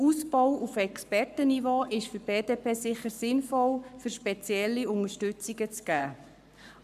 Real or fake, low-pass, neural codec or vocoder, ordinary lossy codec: fake; 14.4 kHz; codec, 44.1 kHz, 7.8 kbps, Pupu-Codec; none